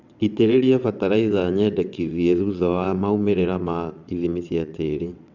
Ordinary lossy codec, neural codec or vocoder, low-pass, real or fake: none; vocoder, 22.05 kHz, 80 mel bands, WaveNeXt; 7.2 kHz; fake